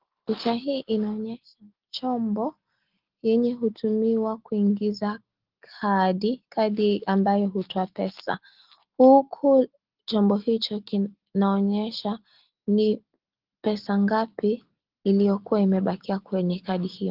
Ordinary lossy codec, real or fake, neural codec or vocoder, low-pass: Opus, 16 kbps; real; none; 5.4 kHz